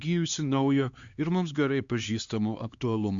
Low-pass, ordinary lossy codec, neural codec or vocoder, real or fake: 7.2 kHz; Opus, 64 kbps; codec, 16 kHz, 2 kbps, X-Codec, HuBERT features, trained on LibriSpeech; fake